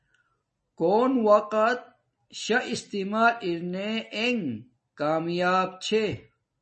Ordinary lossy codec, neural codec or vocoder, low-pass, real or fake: MP3, 32 kbps; none; 10.8 kHz; real